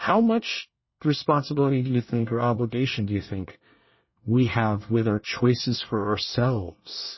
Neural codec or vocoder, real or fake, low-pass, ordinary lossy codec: codec, 24 kHz, 1 kbps, SNAC; fake; 7.2 kHz; MP3, 24 kbps